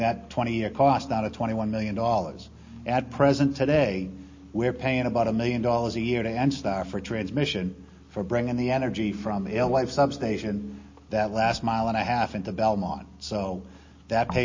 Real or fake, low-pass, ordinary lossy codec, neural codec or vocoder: real; 7.2 kHz; MP3, 32 kbps; none